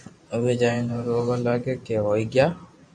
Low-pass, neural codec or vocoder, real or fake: 9.9 kHz; vocoder, 44.1 kHz, 128 mel bands every 512 samples, BigVGAN v2; fake